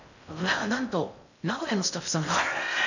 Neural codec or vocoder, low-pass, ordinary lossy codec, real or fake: codec, 16 kHz in and 24 kHz out, 0.6 kbps, FocalCodec, streaming, 4096 codes; 7.2 kHz; none; fake